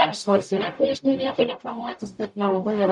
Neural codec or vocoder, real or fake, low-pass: codec, 44.1 kHz, 0.9 kbps, DAC; fake; 10.8 kHz